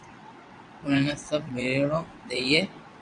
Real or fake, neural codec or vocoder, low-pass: fake; vocoder, 22.05 kHz, 80 mel bands, WaveNeXt; 9.9 kHz